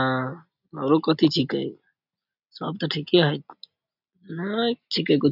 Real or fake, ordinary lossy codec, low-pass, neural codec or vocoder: real; none; 5.4 kHz; none